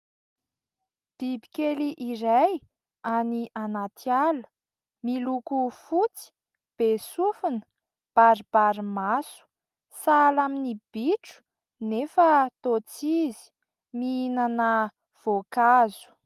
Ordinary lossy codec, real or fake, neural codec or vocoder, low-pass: Opus, 32 kbps; real; none; 14.4 kHz